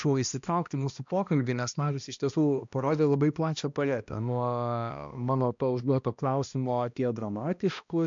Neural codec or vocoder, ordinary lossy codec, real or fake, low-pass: codec, 16 kHz, 1 kbps, X-Codec, HuBERT features, trained on balanced general audio; MP3, 64 kbps; fake; 7.2 kHz